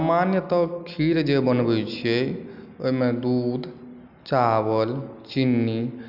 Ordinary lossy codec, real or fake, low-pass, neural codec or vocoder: none; real; 5.4 kHz; none